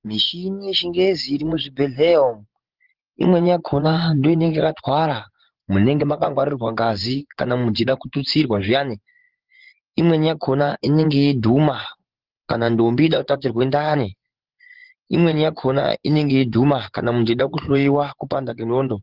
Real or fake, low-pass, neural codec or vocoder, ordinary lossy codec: fake; 5.4 kHz; vocoder, 24 kHz, 100 mel bands, Vocos; Opus, 16 kbps